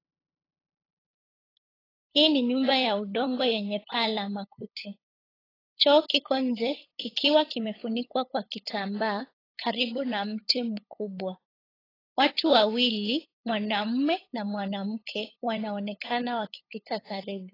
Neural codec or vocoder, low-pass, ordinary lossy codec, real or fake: codec, 16 kHz, 8 kbps, FunCodec, trained on LibriTTS, 25 frames a second; 5.4 kHz; AAC, 24 kbps; fake